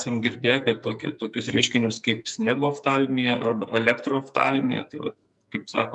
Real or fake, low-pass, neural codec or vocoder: fake; 10.8 kHz; codec, 44.1 kHz, 2.6 kbps, SNAC